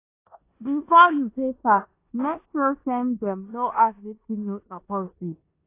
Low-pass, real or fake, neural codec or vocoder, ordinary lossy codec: 3.6 kHz; fake; codec, 16 kHz in and 24 kHz out, 0.9 kbps, LongCat-Audio-Codec, four codebook decoder; AAC, 24 kbps